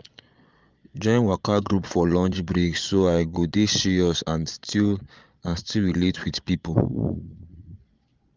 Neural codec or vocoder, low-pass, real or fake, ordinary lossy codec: vocoder, 22.05 kHz, 80 mel bands, Vocos; 7.2 kHz; fake; Opus, 32 kbps